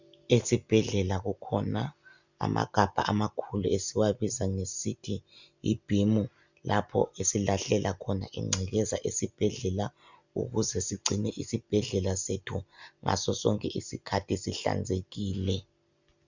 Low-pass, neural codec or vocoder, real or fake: 7.2 kHz; none; real